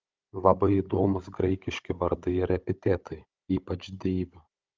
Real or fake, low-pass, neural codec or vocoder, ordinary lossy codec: fake; 7.2 kHz; codec, 16 kHz, 16 kbps, FunCodec, trained on Chinese and English, 50 frames a second; Opus, 24 kbps